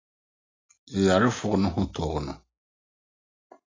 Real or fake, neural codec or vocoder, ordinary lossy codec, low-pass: real; none; AAC, 32 kbps; 7.2 kHz